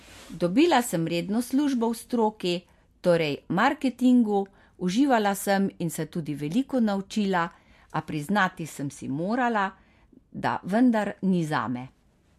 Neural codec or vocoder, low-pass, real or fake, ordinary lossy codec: none; 14.4 kHz; real; MP3, 64 kbps